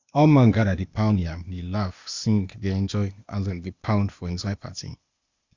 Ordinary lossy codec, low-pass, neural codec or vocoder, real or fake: Opus, 64 kbps; 7.2 kHz; codec, 16 kHz, 0.8 kbps, ZipCodec; fake